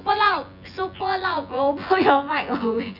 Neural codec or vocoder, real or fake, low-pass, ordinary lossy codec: vocoder, 24 kHz, 100 mel bands, Vocos; fake; 5.4 kHz; none